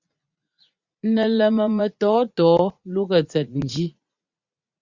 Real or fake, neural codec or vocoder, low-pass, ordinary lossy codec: fake; vocoder, 44.1 kHz, 80 mel bands, Vocos; 7.2 kHz; Opus, 64 kbps